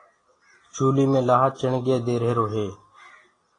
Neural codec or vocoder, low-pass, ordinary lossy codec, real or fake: none; 9.9 kHz; AAC, 32 kbps; real